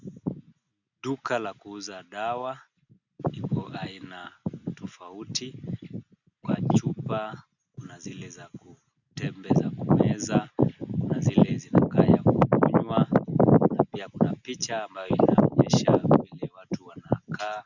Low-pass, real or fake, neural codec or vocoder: 7.2 kHz; real; none